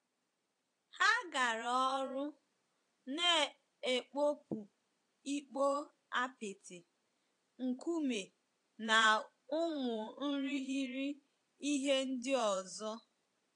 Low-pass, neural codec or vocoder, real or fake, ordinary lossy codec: 9.9 kHz; vocoder, 22.05 kHz, 80 mel bands, Vocos; fake; AAC, 48 kbps